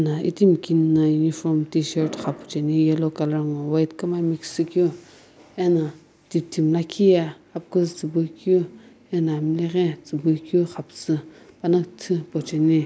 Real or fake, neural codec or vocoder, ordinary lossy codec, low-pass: real; none; none; none